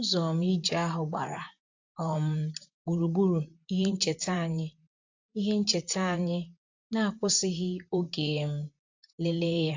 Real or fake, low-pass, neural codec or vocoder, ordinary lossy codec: fake; 7.2 kHz; vocoder, 44.1 kHz, 128 mel bands, Pupu-Vocoder; AAC, 48 kbps